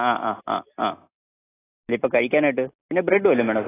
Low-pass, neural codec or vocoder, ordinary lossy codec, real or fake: 3.6 kHz; none; AAC, 16 kbps; real